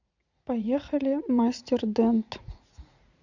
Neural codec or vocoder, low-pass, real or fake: vocoder, 44.1 kHz, 80 mel bands, Vocos; 7.2 kHz; fake